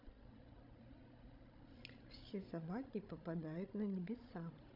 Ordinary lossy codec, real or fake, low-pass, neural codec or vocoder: none; fake; 5.4 kHz; codec, 16 kHz, 16 kbps, FreqCodec, larger model